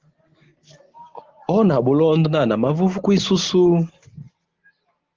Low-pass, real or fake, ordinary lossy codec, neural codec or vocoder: 7.2 kHz; real; Opus, 16 kbps; none